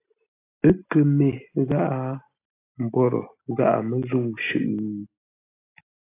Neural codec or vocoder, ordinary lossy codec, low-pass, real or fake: none; AAC, 24 kbps; 3.6 kHz; real